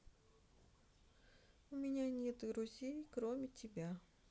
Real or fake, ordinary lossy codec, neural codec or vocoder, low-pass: real; none; none; none